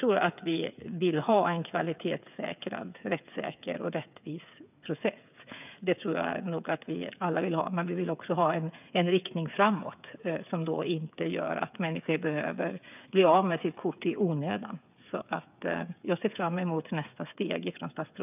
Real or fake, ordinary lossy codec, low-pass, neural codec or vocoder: fake; none; 3.6 kHz; codec, 16 kHz, 8 kbps, FreqCodec, smaller model